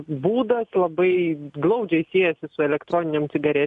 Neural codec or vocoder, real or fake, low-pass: none; real; 10.8 kHz